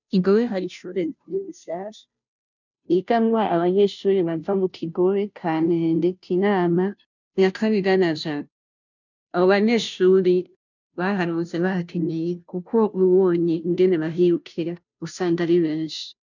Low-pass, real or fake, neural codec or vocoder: 7.2 kHz; fake; codec, 16 kHz, 0.5 kbps, FunCodec, trained on Chinese and English, 25 frames a second